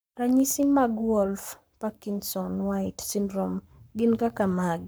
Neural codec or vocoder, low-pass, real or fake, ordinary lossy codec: codec, 44.1 kHz, 7.8 kbps, Pupu-Codec; none; fake; none